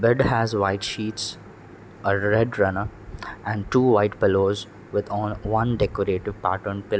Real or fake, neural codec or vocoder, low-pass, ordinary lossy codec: real; none; none; none